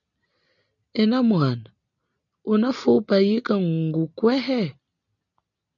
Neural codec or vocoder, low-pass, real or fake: none; 7.2 kHz; real